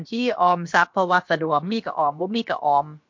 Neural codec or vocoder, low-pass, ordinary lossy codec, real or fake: codec, 16 kHz, about 1 kbps, DyCAST, with the encoder's durations; 7.2 kHz; MP3, 48 kbps; fake